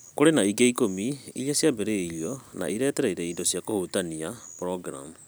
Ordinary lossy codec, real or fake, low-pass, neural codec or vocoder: none; real; none; none